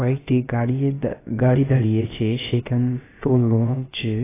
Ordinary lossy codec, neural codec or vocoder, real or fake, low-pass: AAC, 16 kbps; codec, 16 kHz, about 1 kbps, DyCAST, with the encoder's durations; fake; 3.6 kHz